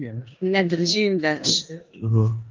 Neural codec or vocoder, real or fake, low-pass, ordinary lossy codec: codec, 16 kHz in and 24 kHz out, 0.9 kbps, LongCat-Audio-Codec, four codebook decoder; fake; 7.2 kHz; Opus, 24 kbps